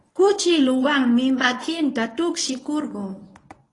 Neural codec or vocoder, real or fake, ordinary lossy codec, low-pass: codec, 24 kHz, 0.9 kbps, WavTokenizer, medium speech release version 1; fake; AAC, 32 kbps; 10.8 kHz